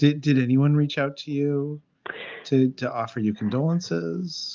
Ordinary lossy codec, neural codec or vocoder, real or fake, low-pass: Opus, 32 kbps; none; real; 7.2 kHz